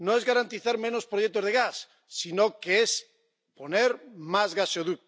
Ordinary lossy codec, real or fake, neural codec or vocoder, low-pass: none; real; none; none